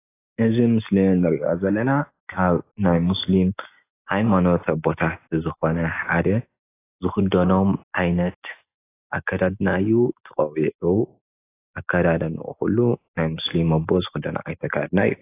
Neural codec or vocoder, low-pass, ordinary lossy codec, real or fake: none; 3.6 kHz; AAC, 24 kbps; real